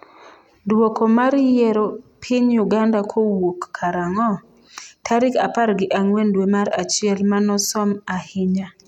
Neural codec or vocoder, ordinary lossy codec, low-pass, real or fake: none; none; 19.8 kHz; real